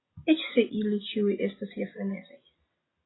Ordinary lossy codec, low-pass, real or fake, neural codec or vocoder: AAC, 16 kbps; 7.2 kHz; real; none